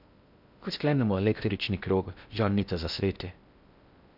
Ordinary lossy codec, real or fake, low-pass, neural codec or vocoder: none; fake; 5.4 kHz; codec, 16 kHz in and 24 kHz out, 0.6 kbps, FocalCodec, streaming, 2048 codes